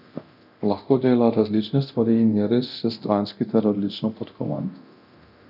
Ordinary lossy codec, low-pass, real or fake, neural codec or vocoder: none; 5.4 kHz; fake; codec, 24 kHz, 0.9 kbps, DualCodec